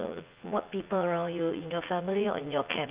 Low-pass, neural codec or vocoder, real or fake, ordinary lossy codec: 3.6 kHz; vocoder, 44.1 kHz, 80 mel bands, Vocos; fake; Opus, 24 kbps